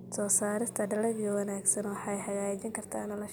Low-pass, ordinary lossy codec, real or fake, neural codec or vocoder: none; none; real; none